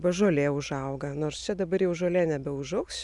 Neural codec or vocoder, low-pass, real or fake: none; 10.8 kHz; real